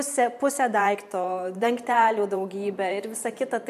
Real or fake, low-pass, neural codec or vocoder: fake; 14.4 kHz; vocoder, 44.1 kHz, 128 mel bands every 512 samples, BigVGAN v2